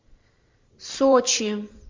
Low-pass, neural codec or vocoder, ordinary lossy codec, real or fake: 7.2 kHz; vocoder, 44.1 kHz, 128 mel bands every 512 samples, BigVGAN v2; MP3, 48 kbps; fake